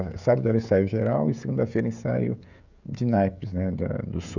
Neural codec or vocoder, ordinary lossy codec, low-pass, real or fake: codec, 16 kHz, 16 kbps, FreqCodec, smaller model; none; 7.2 kHz; fake